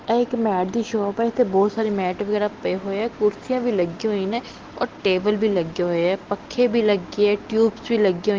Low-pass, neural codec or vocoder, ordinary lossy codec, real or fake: 7.2 kHz; none; Opus, 16 kbps; real